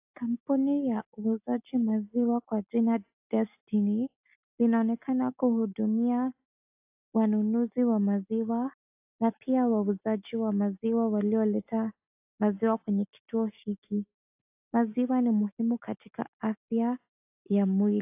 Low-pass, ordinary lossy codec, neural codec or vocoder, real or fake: 3.6 kHz; AAC, 32 kbps; none; real